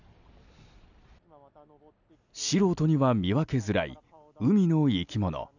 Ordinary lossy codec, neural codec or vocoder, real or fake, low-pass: none; none; real; 7.2 kHz